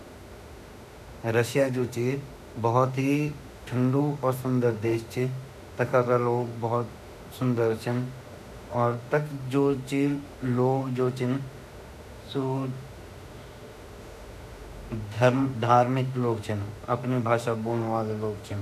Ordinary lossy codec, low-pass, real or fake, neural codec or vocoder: none; 14.4 kHz; fake; autoencoder, 48 kHz, 32 numbers a frame, DAC-VAE, trained on Japanese speech